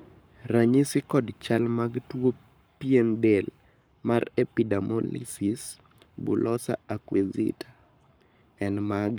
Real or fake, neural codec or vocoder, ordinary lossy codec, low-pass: fake; codec, 44.1 kHz, 7.8 kbps, Pupu-Codec; none; none